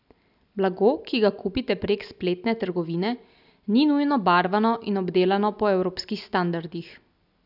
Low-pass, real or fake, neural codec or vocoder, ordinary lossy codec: 5.4 kHz; real; none; none